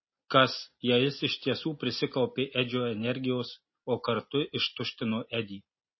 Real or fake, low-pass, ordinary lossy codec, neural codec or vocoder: real; 7.2 kHz; MP3, 24 kbps; none